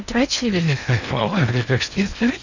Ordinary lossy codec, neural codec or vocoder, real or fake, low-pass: none; codec, 16 kHz in and 24 kHz out, 0.8 kbps, FocalCodec, streaming, 65536 codes; fake; 7.2 kHz